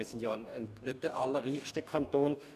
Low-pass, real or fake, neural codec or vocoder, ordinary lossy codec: 14.4 kHz; fake; codec, 44.1 kHz, 2.6 kbps, DAC; none